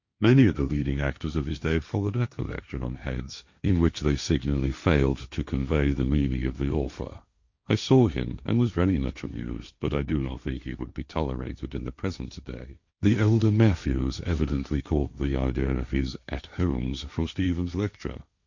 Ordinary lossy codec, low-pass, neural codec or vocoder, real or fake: Opus, 64 kbps; 7.2 kHz; codec, 16 kHz, 1.1 kbps, Voila-Tokenizer; fake